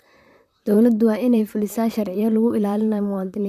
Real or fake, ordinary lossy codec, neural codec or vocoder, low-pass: fake; none; vocoder, 44.1 kHz, 128 mel bands, Pupu-Vocoder; 14.4 kHz